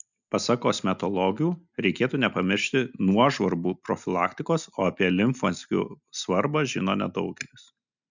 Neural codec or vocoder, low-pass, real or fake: none; 7.2 kHz; real